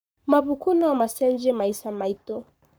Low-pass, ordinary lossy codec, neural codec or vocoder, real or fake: none; none; codec, 44.1 kHz, 7.8 kbps, Pupu-Codec; fake